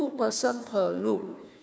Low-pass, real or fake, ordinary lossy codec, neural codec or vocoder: none; fake; none; codec, 16 kHz, 1 kbps, FunCodec, trained on Chinese and English, 50 frames a second